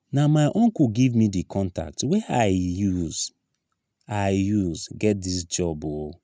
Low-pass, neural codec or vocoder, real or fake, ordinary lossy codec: none; none; real; none